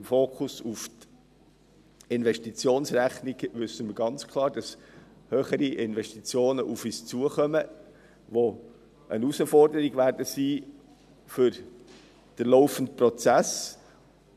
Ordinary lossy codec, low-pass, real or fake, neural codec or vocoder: none; 14.4 kHz; real; none